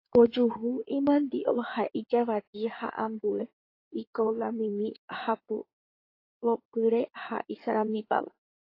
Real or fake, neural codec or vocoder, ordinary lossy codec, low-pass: fake; codec, 16 kHz in and 24 kHz out, 2.2 kbps, FireRedTTS-2 codec; AAC, 32 kbps; 5.4 kHz